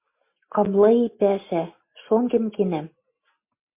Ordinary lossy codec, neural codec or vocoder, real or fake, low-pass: MP3, 24 kbps; none; real; 3.6 kHz